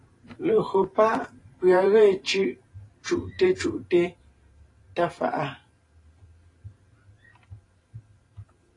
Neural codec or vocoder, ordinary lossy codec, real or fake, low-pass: vocoder, 44.1 kHz, 128 mel bands every 512 samples, BigVGAN v2; AAC, 32 kbps; fake; 10.8 kHz